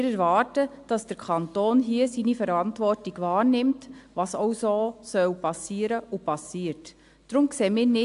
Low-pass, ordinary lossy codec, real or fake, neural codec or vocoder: 10.8 kHz; AAC, 64 kbps; real; none